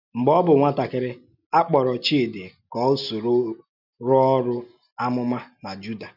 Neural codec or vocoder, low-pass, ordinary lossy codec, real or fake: none; 5.4 kHz; none; real